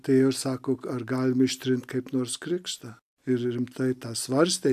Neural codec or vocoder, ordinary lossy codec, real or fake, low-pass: none; AAC, 96 kbps; real; 14.4 kHz